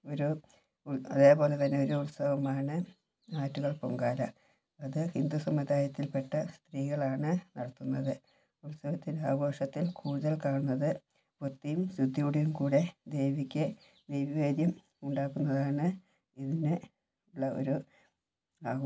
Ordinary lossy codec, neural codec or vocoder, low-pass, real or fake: none; none; none; real